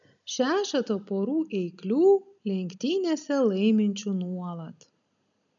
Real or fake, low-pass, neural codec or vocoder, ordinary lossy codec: real; 7.2 kHz; none; MP3, 96 kbps